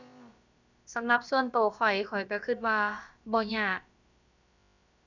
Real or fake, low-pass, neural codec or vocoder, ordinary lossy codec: fake; 7.2 kHz; codec, 16 kHz, about 1 kbps, DyCAST, with the encoder's durations; none